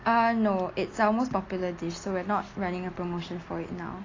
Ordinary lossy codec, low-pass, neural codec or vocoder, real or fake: AAC, 32 kbps; 7.2 kHz; none; real